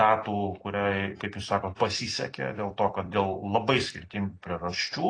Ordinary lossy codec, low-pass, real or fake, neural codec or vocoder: AAC, 32 kbps; 9.9 kHz; fake; vocoder, 44.1 kHz, 128 mel bands every 256 samples, BigVGAN v2